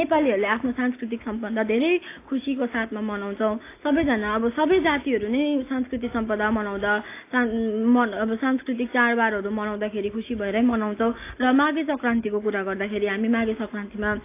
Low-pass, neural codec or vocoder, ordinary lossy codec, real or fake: 3.6 kHz; none; AAC, 24 kbps; real